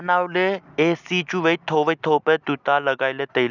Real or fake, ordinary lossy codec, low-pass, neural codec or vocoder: real; none; 7.2 kHz; none